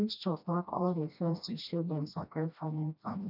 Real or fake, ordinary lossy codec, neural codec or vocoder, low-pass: fake; none; codec, 16 kHz, 1 kbps, FreqCodec, smaller model; 5.4 kHz